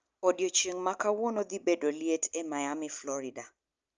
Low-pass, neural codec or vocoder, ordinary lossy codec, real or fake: 7.2 kHz; none; Opus, 24 kbps; real